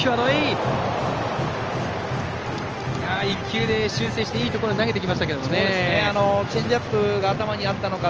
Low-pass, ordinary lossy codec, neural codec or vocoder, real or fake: 7.2 kHz; Opus, 24 kbps; none; real